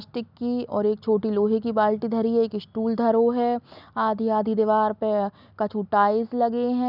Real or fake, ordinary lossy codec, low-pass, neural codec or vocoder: real; none; 5.4 kHz; none